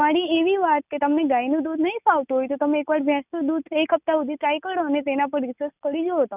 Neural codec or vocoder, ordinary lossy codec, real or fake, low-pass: none; none; real; 3.6 kHz